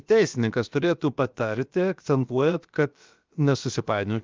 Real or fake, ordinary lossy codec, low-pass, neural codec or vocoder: fake; Opus, 24 kbps; 7.2 kHz; codec, 16 kHz, about 1 kbps, DyCAST, with the encoder's durations